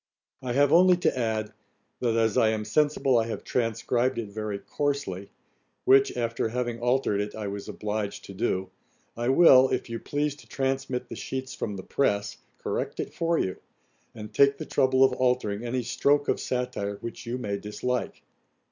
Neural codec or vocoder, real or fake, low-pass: none; real; 7.2 kHz